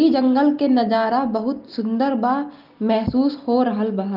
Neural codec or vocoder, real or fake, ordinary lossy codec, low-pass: none; real; Opus, 32 kbps; 5.4 kHz